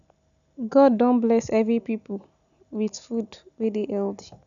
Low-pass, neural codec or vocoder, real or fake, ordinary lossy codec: 7.2 kHz; none; real; none